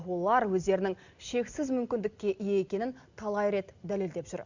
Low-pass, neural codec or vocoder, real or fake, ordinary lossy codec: 7.2 kHz; none; real; Opus, 64 kbps